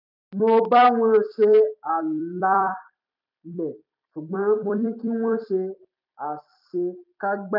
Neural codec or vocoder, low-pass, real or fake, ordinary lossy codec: vocoder, 44.1 kHz, 128 mel bands every 256 samples, BigVGAN v2; 5.4 kHz; fake; none